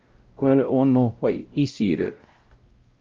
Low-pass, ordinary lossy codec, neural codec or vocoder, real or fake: 7.2 kHz; Opus, 24 kbps; codec, 16 kHz, 0.5 kbps, X-Codec, WavLM features, trained on Multilingual LibriSpeech; fake